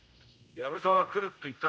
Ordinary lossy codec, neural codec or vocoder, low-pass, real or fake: none; codec, 16 kHz, 0.5 kbps, X-Codec, HuBERT features, trained on general audio; none; fake